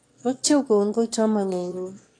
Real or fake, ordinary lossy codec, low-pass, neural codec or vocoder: fake; AAC, 48 kbps; 9.9 kHz; autoencoder, 22.05 kHz, a latent of 192 numbers a frame, VITS, trained on one speaker